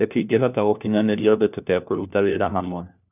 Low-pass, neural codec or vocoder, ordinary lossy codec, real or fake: 3.6 kHz; codec, 16 kHz, 1 kbps, FunCodec, trained on LibriTTS, 50 frames a second; none; fake